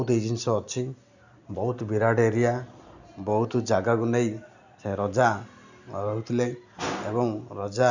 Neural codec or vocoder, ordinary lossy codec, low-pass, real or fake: none; none; 7.2 kHz; real